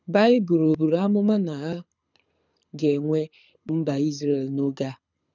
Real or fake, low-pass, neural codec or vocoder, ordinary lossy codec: fake; 7.2 kHz; codec, 24 kHz, 6 kbps, HILCodec; none